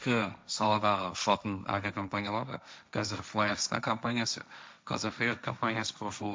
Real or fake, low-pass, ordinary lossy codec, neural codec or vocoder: fake; none; none; codec, 16 kHz, 1.1 kbps, Voila-Tokenizer